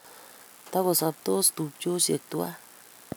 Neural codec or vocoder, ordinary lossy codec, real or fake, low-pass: none; none; real; none